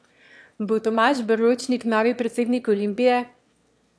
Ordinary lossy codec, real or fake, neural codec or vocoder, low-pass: none; fake; autoencoder, 22.05 kHz, a latent of 192 numbers a frame, VITS, trained on one speaker; none